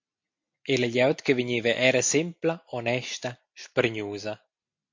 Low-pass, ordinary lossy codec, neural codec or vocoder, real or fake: 7.2 kHz; MP3, 48 kbps; none; real